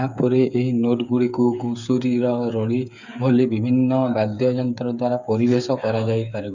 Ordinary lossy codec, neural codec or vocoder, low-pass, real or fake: none; codec, 16 kHz, 8 kbps, FreqCodec, smaller model; 7.2 kHz; fake